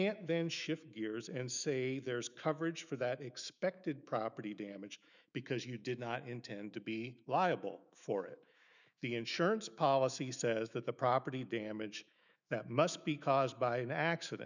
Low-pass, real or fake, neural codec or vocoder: 7.2 kHz; fake; autoencoder, 48 kHz, 128 numbers a frame, DAC-VAE, trained on Japanese speech